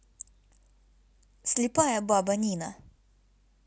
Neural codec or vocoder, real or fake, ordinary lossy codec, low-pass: none; real; none; none